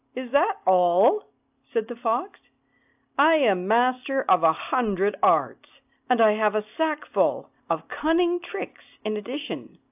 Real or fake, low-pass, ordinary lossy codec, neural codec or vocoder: real; 3.6 kHz; AAC, 32 kbps; none